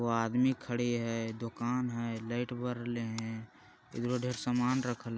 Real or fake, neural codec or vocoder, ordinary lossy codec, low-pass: real; none; none; none